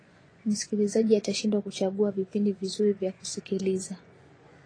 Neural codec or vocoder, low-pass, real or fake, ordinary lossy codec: vocoder, 24 kHz, 100 mel bands, Vocos; 9.9 kHz; fake; AAC, 32 kbps